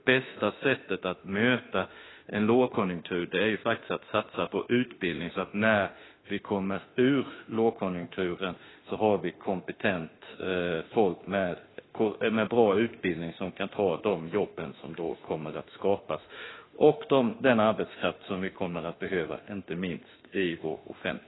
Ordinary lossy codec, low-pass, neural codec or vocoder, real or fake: AAC, 16 kbps; 7.2 kHz; autoencoder, 48 kHz, 32 numbers a frame, DAC-VAE, trained on Japanese speech; fake